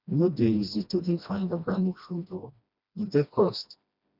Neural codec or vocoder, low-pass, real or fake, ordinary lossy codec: codec, 16 kHz, 1 kbps, FreqCodec, smaller model; 5.4 kHz; fake; none